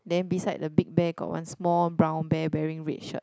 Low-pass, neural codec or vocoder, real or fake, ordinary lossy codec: none; none; real; none